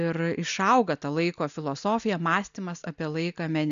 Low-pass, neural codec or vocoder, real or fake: 7.2 kHz; none; real